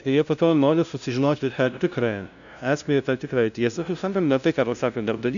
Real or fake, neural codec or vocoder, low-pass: fake; codec, 16 kHz, 0.5 kbps, FunCodec, trained on LibriTTS, 25 frames a second; 7.2 kHz